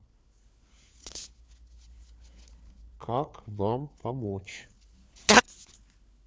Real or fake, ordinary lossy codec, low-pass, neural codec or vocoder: fake; none; none; codec, 16 kHz, 4 kbps, FunCodec, trained on LibriTTS, 50 frames a second